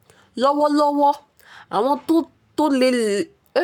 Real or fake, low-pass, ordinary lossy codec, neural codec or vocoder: fake; 19.8 kHz; none; codec, 44.1 kHz, 7.8 kbps, Pupu-Codec